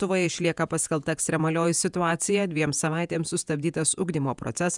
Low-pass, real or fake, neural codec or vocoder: 10.8 kHz; fake; vocoder, 48 kHz, 128 mel bands, Vocos